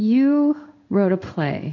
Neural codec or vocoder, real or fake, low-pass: codec, 16 kHz in and 24 kHz out, 1 kbps, XY-Tokenizer; fake; 7.2 kHz